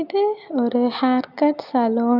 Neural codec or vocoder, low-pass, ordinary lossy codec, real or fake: none; 5.4 kHz; none; real